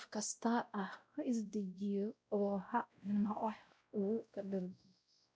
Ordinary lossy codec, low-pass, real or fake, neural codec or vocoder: none; none; fake; codec, 16 kHz, 0.5 kbps, X-Codec, WavLM features, trained on Multilingual LibriSpeech